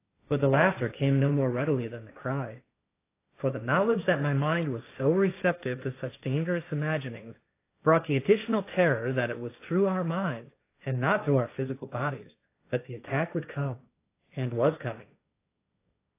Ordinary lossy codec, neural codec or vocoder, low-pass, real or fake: AAC, 24 kbps; codec, 16 kHz, 1.1 kbps, Voila-Tokenizer; 3.6 kHz; fake